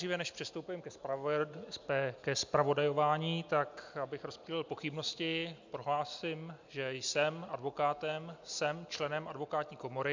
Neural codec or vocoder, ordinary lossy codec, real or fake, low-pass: none; MP3, 64 kbps; real; 7.2 kHz